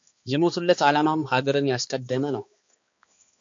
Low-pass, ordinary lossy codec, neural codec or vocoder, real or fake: 7.2 kHz; MP3, 48 kbps; codec, 16 kHz, 2 kbps, X-Codec, HuBERT features, trained on balanced general audio; fake